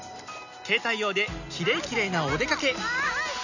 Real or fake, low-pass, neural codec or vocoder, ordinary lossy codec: real; 7.2 kHz; none; none